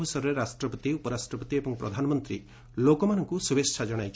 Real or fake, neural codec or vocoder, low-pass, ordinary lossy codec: real; none; none; none